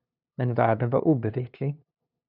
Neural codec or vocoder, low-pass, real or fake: codec, 16 kHz, 2 kbps, FunCodec, trained on LibriTTS, 25 frames a second; 5.4 kHz; fake